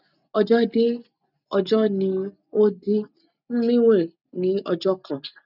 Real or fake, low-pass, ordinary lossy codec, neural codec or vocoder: real; 5.4 kHz; none; none